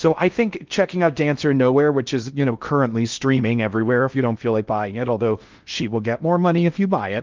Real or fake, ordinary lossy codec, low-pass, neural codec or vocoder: fake; Opus, 32 kbps; 7.2 kHz; codec, 16 kHz in and 24 kHz out, 0.6 kbps, FocalCodec, streaming, 4096 codes